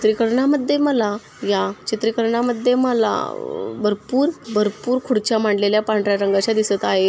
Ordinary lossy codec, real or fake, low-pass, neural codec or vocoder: none; real; none; none